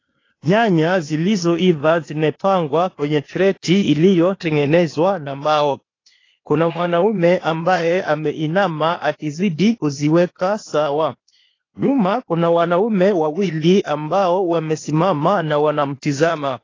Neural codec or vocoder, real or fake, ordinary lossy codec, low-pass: codec, 16 kHz, 0.8 kbps, ZipCodec; fake; AAC, 32 kbps; 7.2 kHz